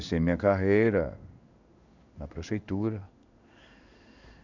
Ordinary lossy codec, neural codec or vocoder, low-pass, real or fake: none; codec, 16 kHz in and 24 kHz out, 1 kbps, XY-Tokenizer; 7.2 kHz; fake